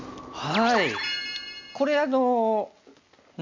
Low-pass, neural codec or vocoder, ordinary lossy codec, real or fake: 7.2 kHz; none; MP3, 64 kbps; real